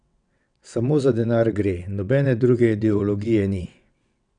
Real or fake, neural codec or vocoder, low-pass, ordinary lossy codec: fake; vocoder, 22.05 kHz, 80 mel bands, WaveNeXt; 9.9 kHz; none